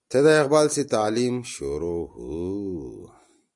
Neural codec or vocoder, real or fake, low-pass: none; real; 10.8 kHz